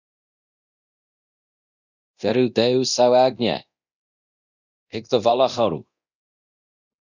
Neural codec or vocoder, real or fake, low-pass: codec, 24 kHz, 0.9 kbps, DualCodec; fake; 7.2 kHz